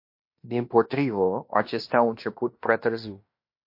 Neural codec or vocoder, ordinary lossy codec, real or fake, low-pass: codec, 16 kHz in and 24 kHz out, 0.9 kbps, LongCat-Audio-Codec, fine tuned four codebook decoder; MP3, 32 kbps; fake; 5.4 kHz